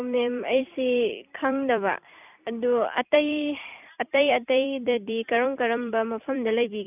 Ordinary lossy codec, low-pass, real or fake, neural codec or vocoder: none; 3.6 kHz; fake; vocoder, 44.1 kHz, 128 mel bands every 256 samples, BigVGAN v2